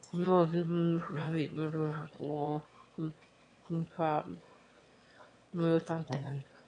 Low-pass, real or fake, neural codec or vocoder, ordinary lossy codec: 9.9 kHz; fake; autoencoder, 22.05 kHz, a latent of 192 numbers a frame, VITS, trained on one speaker; AAC, 48 kbps